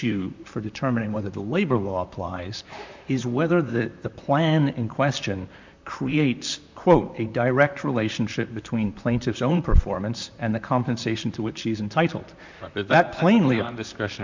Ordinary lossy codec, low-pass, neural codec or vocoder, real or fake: MP3, 64 kbps; 7.2 kHz; vocoder, 44.1 kHz, 128 mel bands, Pupu-Vocoder; fake